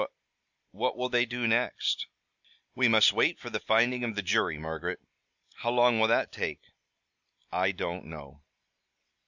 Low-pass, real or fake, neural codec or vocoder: 7.2 kHz; real; none